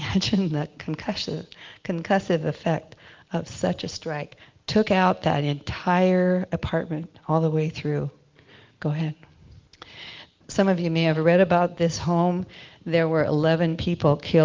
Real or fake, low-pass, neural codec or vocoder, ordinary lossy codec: real; 7.2 kHz; none; Opus, 24 kbps